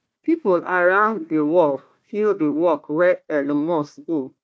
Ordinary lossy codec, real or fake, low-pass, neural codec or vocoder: none; fake; none; codec, 16 kHz, 1 kbps, FunCodec, trained on Chinese and English, 50 frames a second